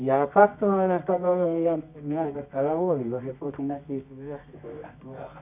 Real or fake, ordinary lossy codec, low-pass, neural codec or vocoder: fake; none; 3.6 kHz; codec, 24 kHz, 0.9 kbps, WavTokenizer, medium music audio release